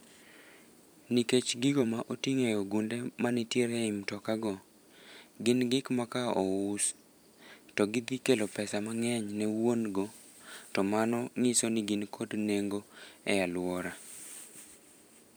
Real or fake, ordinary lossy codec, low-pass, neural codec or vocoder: fake; none; none; vocoder, 44.1 kHz, 128 mel bands every 512 samples, BigVGAN v2